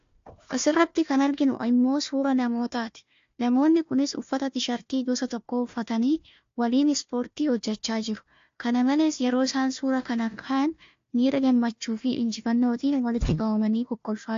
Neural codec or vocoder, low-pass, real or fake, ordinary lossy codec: codec, 16 kHz, 1 kbps, FunCodec, trained on Chinese and English, 50 frames a second; 7.2 kHz; fake; AAC, 48 kbps